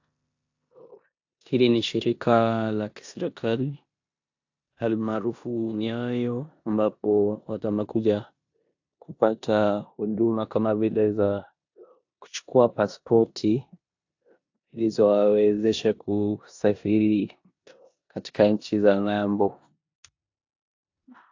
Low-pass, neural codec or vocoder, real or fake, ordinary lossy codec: 7.2 kHz; codec, 16 kHz in and 24 kHz out, 0.9 kbps, LongCat-Audio-Codec, four codebook decoder; fake; AAC, 48 kbps